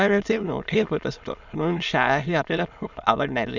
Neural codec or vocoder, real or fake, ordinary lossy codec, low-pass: autoencoder, 22.05 kHz, a latent of 192 numbers a frame, VITS, trained on many speakers; fake; none; 7.2 kHz